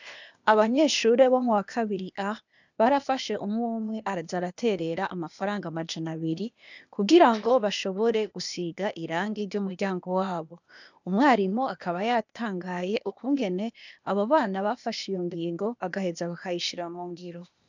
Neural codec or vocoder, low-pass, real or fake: codec, 16 kHz, 0.8 kbps, ZipCodec; 7.2 kHz; fake